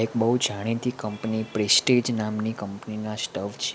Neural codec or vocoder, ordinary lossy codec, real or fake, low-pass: none; none; real; none